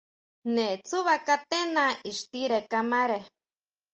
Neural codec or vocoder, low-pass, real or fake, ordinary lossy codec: none; 7.2 kHz; real; Opus, 24 kbps